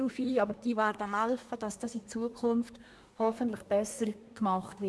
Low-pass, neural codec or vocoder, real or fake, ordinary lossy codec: none; codec, 24 kHz, 1 kbps, SNAC; fake; none